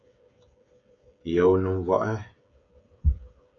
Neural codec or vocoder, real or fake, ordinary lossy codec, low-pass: codec, 16 kHz, 8 kbps, FreqCodec, smaller model; fake; MP3, 64 kbps; 7.2 kHz